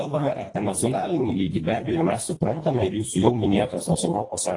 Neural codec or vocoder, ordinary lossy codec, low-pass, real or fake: codec, 24 kHz, 1.5 kbps, HILCodec; AAC, 32 kbps; 10.8 kHz; fake